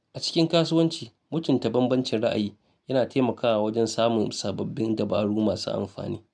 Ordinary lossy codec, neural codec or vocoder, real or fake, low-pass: none; none; real; none